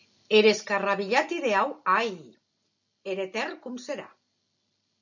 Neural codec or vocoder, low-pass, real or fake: none; 7.2 kHz; real